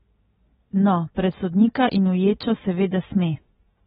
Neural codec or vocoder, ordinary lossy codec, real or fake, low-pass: none; AAC, 16 kbps; real; 19.8 kHz